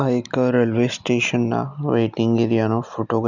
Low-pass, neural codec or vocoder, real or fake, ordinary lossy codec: 7.2 kHz; none; real; none